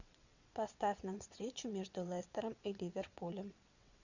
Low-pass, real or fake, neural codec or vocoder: 7.2 kHz; fake; vocoder, 22.05 kHz, 80 mel bands, WaveNeXt